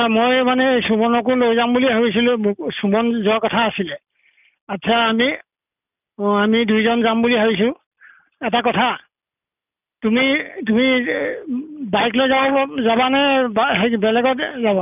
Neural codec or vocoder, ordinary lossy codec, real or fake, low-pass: none; none; real; 3.6 kHz